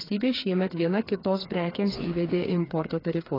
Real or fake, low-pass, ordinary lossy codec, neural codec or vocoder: fake; 5.4 kHz; AAC, 24 kbps; codec, 16 kHz, 4 kbps, FreqCodec, smaller model